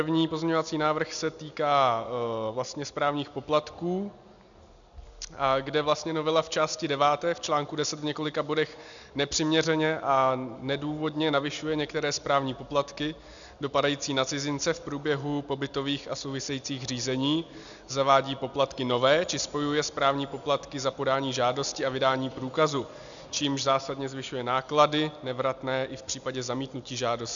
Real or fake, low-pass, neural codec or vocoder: real; 7.2 kHz; none